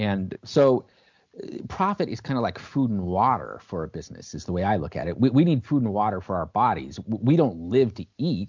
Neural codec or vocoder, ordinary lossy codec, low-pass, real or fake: none; MP3, 64 kbps; 7.2 kHz; real